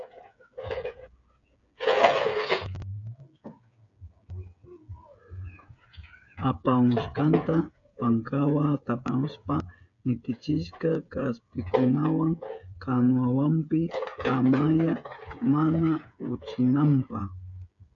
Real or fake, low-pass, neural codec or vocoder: fake; 7.2 kHz; codec, 16 kHz, 8 kbps, FreqCodec, smaller model